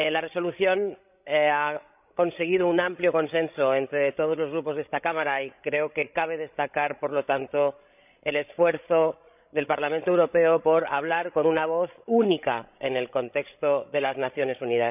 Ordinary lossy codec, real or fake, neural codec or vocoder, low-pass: none; fake; codec, 16 kHz, 16 kbps, FreqCodec, larger model; 3.6 kHz